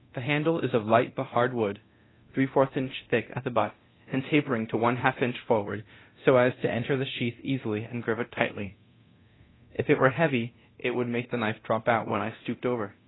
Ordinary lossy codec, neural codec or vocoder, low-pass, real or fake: AAC, 16 kbps; codec, 24 kHz, 0.9 kbps, DualCodec; 7.2 kHz; fake